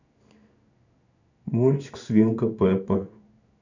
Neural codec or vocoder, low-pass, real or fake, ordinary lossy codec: codec, 16 kHz in and 24 kHz out, 1 kbps, XY-Tokenizer; 7.2 kHz; fake; none